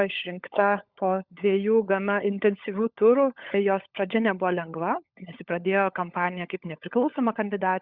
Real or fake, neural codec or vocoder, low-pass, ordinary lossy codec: fake; codec, 16 kHz, 16 kbps, FunCodec, trained on LibriTTS, 50 frames a second; 5.4 kHz; Opus, 64 kbps